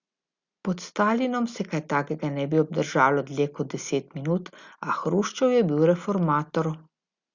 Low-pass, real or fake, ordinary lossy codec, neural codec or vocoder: 7.2 kHz; real; Opus, 64 kbps; none